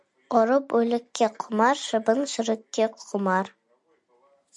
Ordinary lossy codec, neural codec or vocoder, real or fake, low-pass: MP3, 96 kbps; none; real; 10.8 kHz